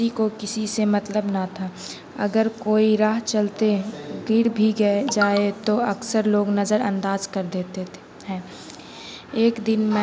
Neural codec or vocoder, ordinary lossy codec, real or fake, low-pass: none; none; real; none